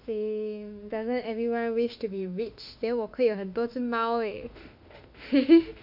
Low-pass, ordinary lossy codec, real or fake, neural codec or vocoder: 5.4 kHz; none; fake; autoencoder, 48 kHz, 32 numbers a frame, DAC-VAE, trained on Japanese speech